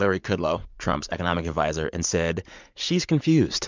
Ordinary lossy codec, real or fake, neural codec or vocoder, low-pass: MP3, 64 kbps; fake; vocoder, 44.1 kHz, 80 mel bands, Vocos; 7.2 kHz